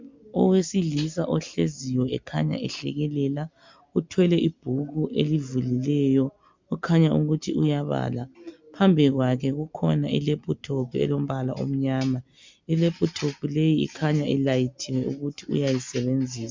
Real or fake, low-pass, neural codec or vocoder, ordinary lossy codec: real; 7.2 kHz; none; MP3, 64 kbps